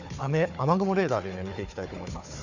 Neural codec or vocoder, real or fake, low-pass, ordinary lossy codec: codec, 16 kHz, 4 kbps, FunCodec, trained on Chinese and English, 50 frames a second; fake; 7.2 kHz; none